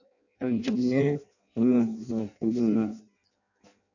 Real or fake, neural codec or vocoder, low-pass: fake; codec, 16 kHz in and 24 kHz out, 0.6 kbps, FireRedTTS-2 codec; 7.2 kHz